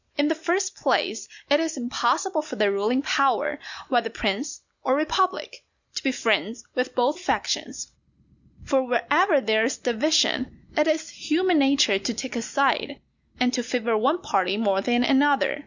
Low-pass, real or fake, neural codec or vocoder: 7.2 kHz; real; none